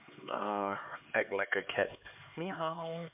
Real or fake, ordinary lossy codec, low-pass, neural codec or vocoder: fake; MP3, 32 kbps; 3.6 kHz; codec, 16 kHz, 4 kbps, X-Codec, HuBERT features, trained on LibriSpeech